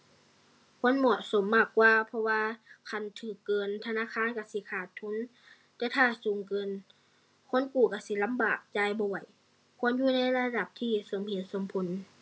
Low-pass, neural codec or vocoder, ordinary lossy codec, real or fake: none; none; none; real